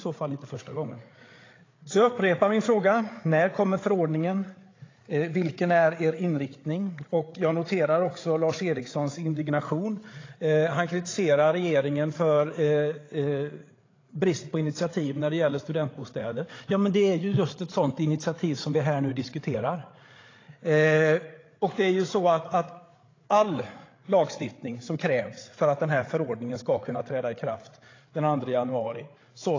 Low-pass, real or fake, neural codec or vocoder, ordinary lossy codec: 7.2 kHz; fake; codec, 16 kHz, 8 kbps, FreqCodec, larger model; AAC, 32 kbps